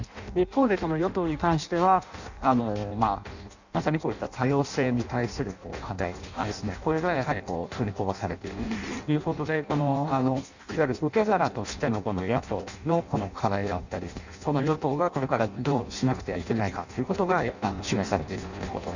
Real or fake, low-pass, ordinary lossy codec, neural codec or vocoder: fake; 7.2 kHz; none; codec, 16 kHz in and 24 kHz out, 0.6 kbps, FireRedTTS-2 codec